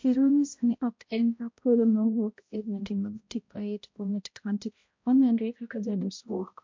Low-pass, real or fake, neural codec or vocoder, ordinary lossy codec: 7.2 kHz; fake; codec, 16 kHz, 0.5 kbps, X-Codec, HuBERT features, trained on balanced general audio; MP3, 48 kbps